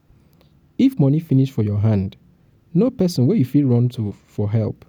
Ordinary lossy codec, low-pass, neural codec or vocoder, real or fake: none; 19.8 kHz; none; real